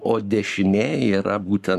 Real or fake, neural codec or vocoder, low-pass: fake; codec, 44.1 kHz, 7.8 kbps, Pupu-Codec; 14.4 kHz